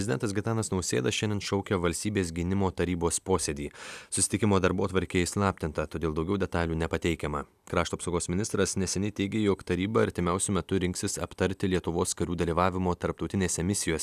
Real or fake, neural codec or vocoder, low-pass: real; none; 14.4 kHz